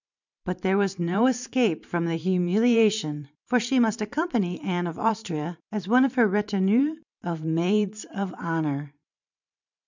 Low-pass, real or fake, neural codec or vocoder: 7.2 kHz; fake; vocoder, 44.1 kHz, 128 mel bands every 512 samples, BigVGAN v2